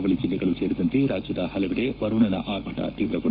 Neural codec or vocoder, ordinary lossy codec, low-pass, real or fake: codec, 44.1 kHz, 7.8 kbps, Pupu-Codec; Opus, 64 kbps; 5.4 kHz; fake